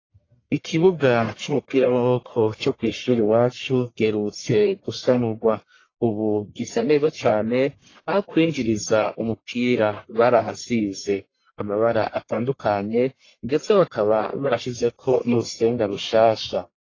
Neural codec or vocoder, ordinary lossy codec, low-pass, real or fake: codec, 44.1 kHz, 1.7 kbps, Pupu-Codec; AAC, 32 kbps; 7.2 kHz; fake